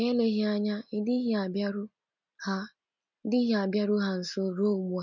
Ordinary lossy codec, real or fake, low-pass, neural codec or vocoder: none; real; 7.2 kHz; none